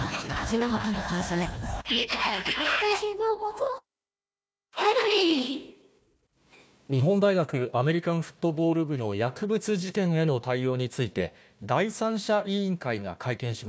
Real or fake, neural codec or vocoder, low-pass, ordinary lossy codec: fake; codec, 16 kHz, 1 kbps, FunCodec, trained on Chinese and English, 50 frames a second; none; none